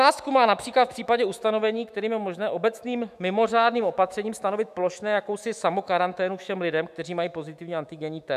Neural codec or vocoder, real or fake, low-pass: autoencoder, 48 kHz, 128 numbers a frame, DAC-VAE, trained on Japanese speech; fake; 14.4 kHz